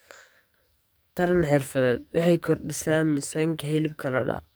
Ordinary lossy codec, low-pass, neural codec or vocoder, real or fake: none; none; codec, 44.1 kHz, 2.6 kbps, SNAC; fake